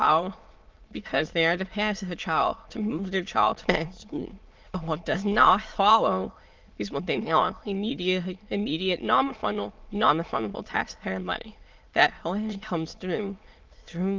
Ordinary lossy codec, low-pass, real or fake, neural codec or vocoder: Opus, 32 kbps; 7.2 kHz; fake; autoencoder, 22.05 kHz, a latent of 192 numbers a frame, VITS, trained on many speakers